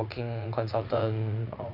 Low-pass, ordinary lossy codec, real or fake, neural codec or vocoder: 5.4 kHz; none; fake; vocoder, 44.1 kHz, 80 mel bands, Vocos